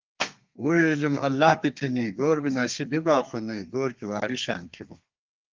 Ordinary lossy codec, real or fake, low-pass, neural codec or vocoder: Opus, 32 kbps; fake; 7.2 kHz; codec, 32 kHz, 1.9 kbps, SNAC